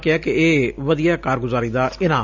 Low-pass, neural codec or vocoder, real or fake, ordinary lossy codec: 7.2 kHz; none; real; none